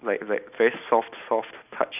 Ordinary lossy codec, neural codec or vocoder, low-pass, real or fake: none; none; 3.6 kHz; real